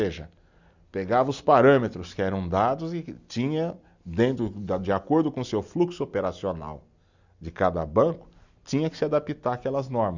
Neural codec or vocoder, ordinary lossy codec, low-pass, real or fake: none; Opus, 64 kbps; 7.2 kHz; real